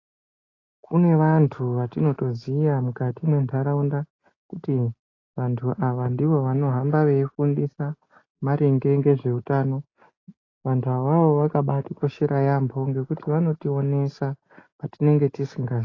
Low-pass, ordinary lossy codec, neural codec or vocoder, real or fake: 7.2 kHz; AAC, 32 kbps; none; real